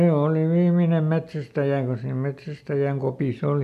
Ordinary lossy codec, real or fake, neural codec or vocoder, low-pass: none; real; none; 14.4 kHz